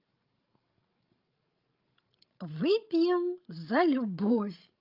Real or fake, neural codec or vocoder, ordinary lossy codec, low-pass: fake; codec, 16 kHz, 16 kbps, FreqCodec, larger model; Opus, 24 kbps; 5.4 kHz